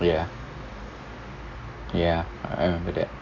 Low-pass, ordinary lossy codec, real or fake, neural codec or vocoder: 7.2 kHz; AAC, 48 kbps; real; none